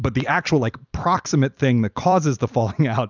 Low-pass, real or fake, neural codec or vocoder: 7.2 kHz; fake; vocoder, 44.1 kHz, 128 mel bands every 256 samples, BigVGAN v2